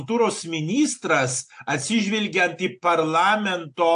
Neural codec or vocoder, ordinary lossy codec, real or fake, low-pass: none; AAC, 64 kbps; real; 9.9 kHz